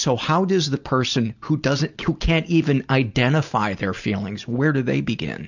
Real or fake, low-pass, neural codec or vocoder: real; 7.2 kHz; none